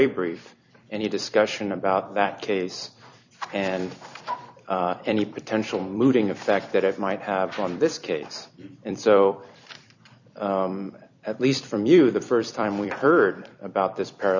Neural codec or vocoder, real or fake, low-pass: vocoder, 44.1 kHz, 128 mel bands every 256 samples, BigVGAN v2; fake; 7.2 kHz